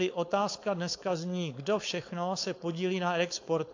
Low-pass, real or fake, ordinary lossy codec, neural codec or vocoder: 7.2 kHz; fake; AAC, 48 kbps; codec, 16 kHz, 4.8 kbps, FACodec